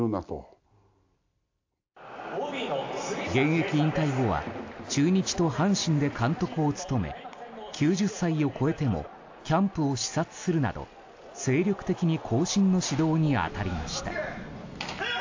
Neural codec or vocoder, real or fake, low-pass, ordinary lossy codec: none; real; 7.2 kHz; AAC, 48 kbps